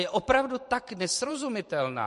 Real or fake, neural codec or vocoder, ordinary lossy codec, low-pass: fake; vocoder, 44.1 kHz, 128 mel bands every 512 samples, BigVGAN v2; MP3, 48 kbps; 14.4 kHz